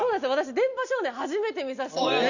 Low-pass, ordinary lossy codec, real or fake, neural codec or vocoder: 7.2 kHz; none; real; none